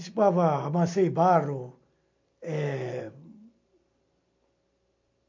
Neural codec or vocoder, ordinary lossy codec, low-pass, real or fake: none; MP3, 48 kbps; 7.2 kHz; real